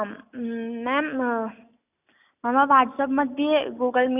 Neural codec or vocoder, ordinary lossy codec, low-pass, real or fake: none; none; 3.6 kHz; real